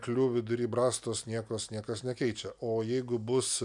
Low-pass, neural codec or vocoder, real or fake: 10.8 kHz; none; real